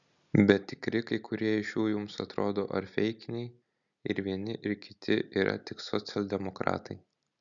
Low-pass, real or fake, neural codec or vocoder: 7.2 kHz; real; none